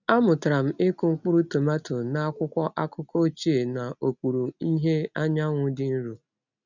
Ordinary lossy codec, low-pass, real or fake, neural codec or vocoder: none; 7.2 kHz; real; none